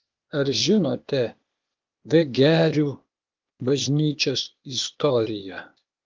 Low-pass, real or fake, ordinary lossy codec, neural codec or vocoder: 7.2 kHz; fake; Opus, 32 kbps; codec, 16 kHz, 0.8 kbps, ZipCodec